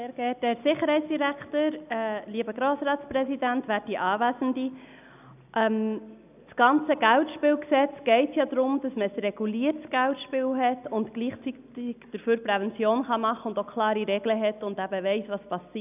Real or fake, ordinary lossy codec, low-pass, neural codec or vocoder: real; none; 3.6 kHz; none